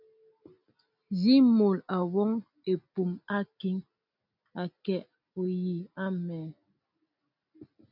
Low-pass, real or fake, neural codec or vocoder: 5.4 kHz; real; none